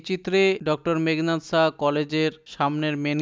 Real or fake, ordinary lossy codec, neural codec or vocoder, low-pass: real; none; none; none